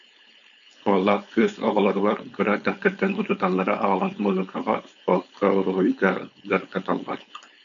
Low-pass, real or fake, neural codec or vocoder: 7.2 kHz; fake; codec, 16 kHz, 4.8 kbps, FACodec